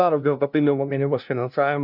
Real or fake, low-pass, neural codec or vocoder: fake; 5.4 kHz; codec, 16 kHz, 0.5 kbps, FunCodec, trained on LibriTTS, 25 frames a second